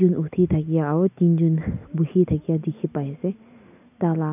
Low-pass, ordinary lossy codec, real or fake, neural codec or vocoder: 3.6 kHz; none; real; none